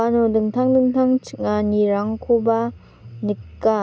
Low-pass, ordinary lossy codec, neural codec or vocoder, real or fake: none; none; none; real